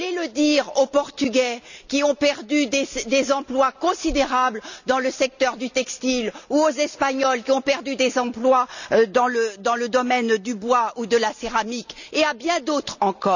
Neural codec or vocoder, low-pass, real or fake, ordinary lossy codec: none; 7.2 kHz; real; none